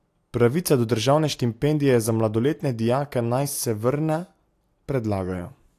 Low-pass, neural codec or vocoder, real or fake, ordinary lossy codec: 14.4 kHz; none; real; AAC, 64 kbps